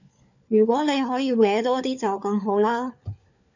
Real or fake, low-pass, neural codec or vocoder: fake; 7.2 kHz; codec, 16 kHz, 4 kbps, FunCodec, trained on LibriTTS, 50 frames a second